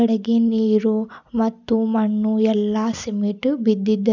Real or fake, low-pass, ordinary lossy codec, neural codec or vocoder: real; 7.2 kHz; none; none